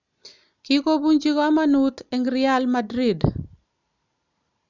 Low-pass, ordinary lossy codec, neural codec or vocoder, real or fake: 7.2 kHz; none; none; real